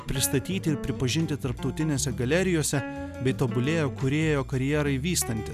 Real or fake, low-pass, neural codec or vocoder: real; 14.4 kHz; none